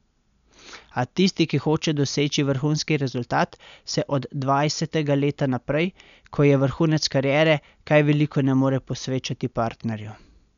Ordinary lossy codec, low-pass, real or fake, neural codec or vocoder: none; 7.2 kHz; real; none